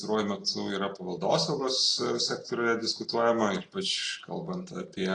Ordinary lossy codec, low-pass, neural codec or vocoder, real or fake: AAC, 32 kbps; 9.9 kHz; none; real